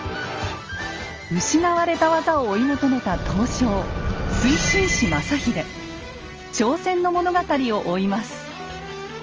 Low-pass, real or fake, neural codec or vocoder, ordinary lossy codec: 7.2 kHz; real; none; Opus, 24 kbps